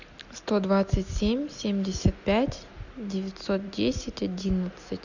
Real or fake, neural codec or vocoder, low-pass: real; none; 7.2 kHz